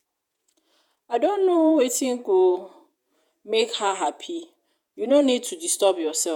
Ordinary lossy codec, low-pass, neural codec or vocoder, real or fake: none; 19.8 kHz; vocoder, 48 kHz, 128 mel bands, Vocos; fake